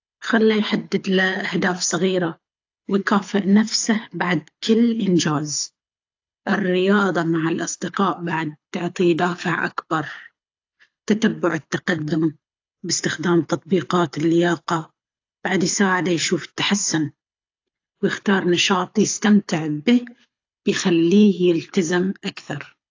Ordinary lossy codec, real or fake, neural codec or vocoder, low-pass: AAC, 48 kbps; fake; codec, 24 kHz, 6 kbps, HILCodec; 7.2 kHz